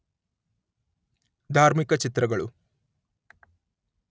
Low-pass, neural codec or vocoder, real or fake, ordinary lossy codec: none; none; real; none